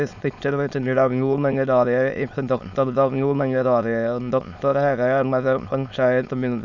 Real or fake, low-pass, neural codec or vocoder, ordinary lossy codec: fake; 7.2 kHz; autoencoder, 22.05 kHz, a latent of 192 numbers a frame, VITS, trained on many speakers; none